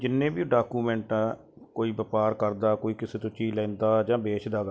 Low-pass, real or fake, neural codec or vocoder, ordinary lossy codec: none; real; none; none